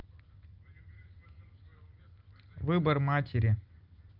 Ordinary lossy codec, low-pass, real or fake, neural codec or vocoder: Opus, 24 kbps; 5.4 kHz; real; none